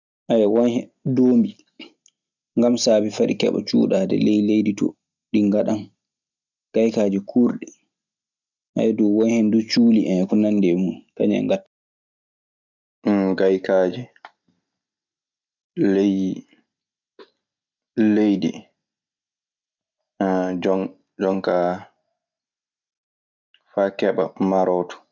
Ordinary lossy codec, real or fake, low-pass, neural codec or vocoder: none; real; 7.2 kHz; none